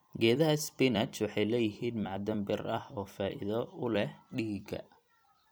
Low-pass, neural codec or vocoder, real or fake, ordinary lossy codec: none; vocoder, 44.1 kHz, 128 mel bands every 256 samples, BigVGAN v2; fake; none